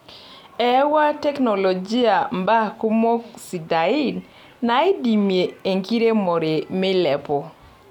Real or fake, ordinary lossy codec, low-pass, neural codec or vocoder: real; none; 19.8 kHz; none